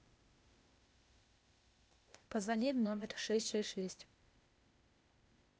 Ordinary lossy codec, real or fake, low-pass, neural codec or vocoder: none; fake; none; codec, 16 kHz, 0.8 kbps, ZipCodec